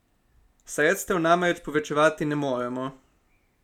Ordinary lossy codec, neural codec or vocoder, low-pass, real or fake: none; none; 19.8 kHz; real